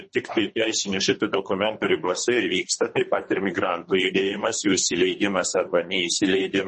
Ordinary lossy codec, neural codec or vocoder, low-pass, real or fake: MP3, 32 kbps; codec, 24 kHz, 3 kbps, HILCodec; 10.8 kHz; fake